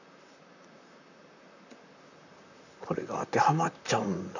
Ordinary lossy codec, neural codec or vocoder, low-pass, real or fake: none; vocoder, 44.1 kHz, 128 mel bands, Pupu-Vocoder; 7.2 kHz; fake